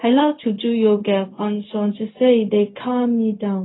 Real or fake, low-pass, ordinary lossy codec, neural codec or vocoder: fake; 7.2 kHz; AAC, 16 kbps; codec, 16 kHz, 0.4 kbps, LongCat-Audio-Codec